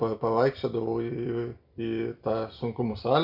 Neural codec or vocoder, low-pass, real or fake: none; 5.4 kHz; real